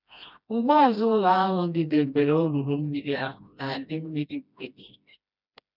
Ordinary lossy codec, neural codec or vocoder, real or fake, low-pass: none; codec, 16 kHz, 1 kbps, FreqCodec, smaller model; fake; 5.4 kHz